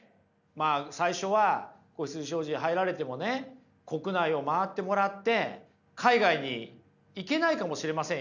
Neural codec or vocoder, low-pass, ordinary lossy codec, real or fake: none; 7.2 kHz; none; real